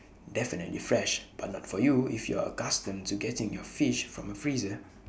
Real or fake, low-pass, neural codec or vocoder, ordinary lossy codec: real; none; none; none